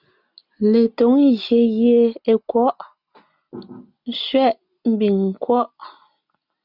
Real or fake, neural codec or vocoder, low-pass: real; none; 5.4 kHz